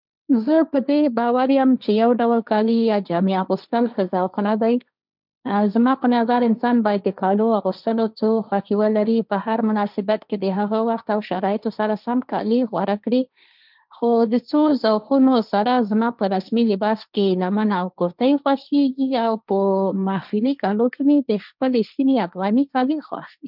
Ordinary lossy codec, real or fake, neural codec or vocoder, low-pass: none; fake; codec, 16 kHz, 1.1 kbps, Voila-Tokenizer; 5.4 kHz